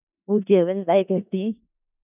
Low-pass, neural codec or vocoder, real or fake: 3.6 kHz; codec, 16 kHz in and 24 kHz out, 0.4 kbps, LongCat-Audio-Codec, four codebook decoder; fake